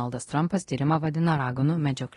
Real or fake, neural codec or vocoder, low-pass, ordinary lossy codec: fake; codec, 24 kHz, 0.9 kbps, DualCodec; 10.8 kHz; AAC, 32 kbps